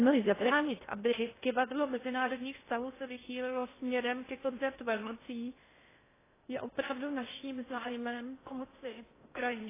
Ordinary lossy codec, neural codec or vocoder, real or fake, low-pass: AAC, 16 kbps; codec, 16 kHz in and 24 kHz out, 0.6 kbps, FocalCodec, streaming, 2048 codes; fake; 3.6 kHz